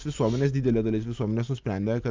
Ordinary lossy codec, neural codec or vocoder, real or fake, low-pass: Opus, 32 kbps; none; real; 7.2 kHz